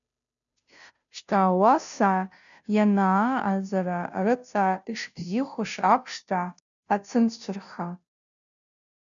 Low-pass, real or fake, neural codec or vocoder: 7.2 kHz; fake; codec, 16 kHz, 0.5 kbps, FunCodec, trained on Chinese and English, 25 frames a second